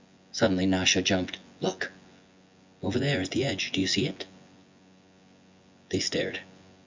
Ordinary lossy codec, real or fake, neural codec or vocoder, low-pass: MP3, 64 kbps; fake; vocoder, 24 kHz, 100 mel bands, Vocos; 7.2 kHz